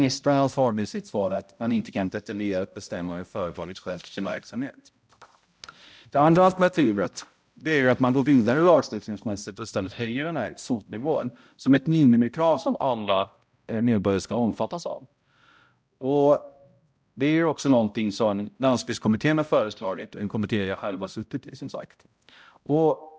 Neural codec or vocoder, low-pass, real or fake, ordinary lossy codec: codec, 16 kHz, 0.5 kbps, X-Codec, HuBERT features, trained on balanced general audio; none; fake; none